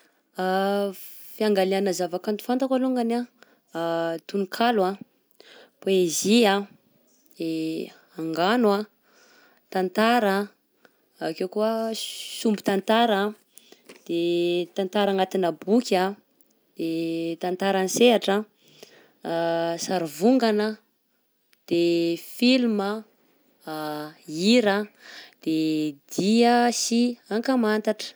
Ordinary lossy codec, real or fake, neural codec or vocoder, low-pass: none; real; none; none